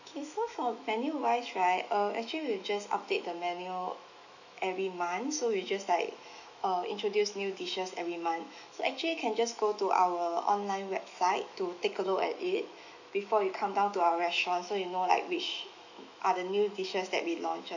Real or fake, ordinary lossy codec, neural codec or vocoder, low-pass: fake; none; autoencoder, 48 kHz, 128 numbers a frame, DAC-VAE, trained on Japanese speech; 7.2 kHz